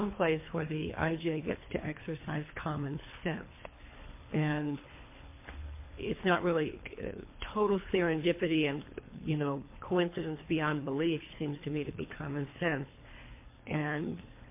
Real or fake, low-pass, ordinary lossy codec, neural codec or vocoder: fake; 3.6 kHz; MP3, 24 kbps; codec, 24 kHz, 3 kbps, HILCodec